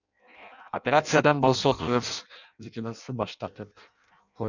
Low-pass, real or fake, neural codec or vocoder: 7.2 kHz; fake; codec, 16 kHz in and 24 kHz out, 0.6 kbps, FireRedTTS-2 codec